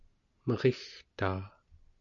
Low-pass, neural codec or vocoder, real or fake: 7.2 kHz; none; real